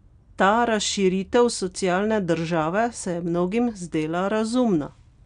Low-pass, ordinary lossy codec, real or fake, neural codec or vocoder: 9.9 kHz; none; real; none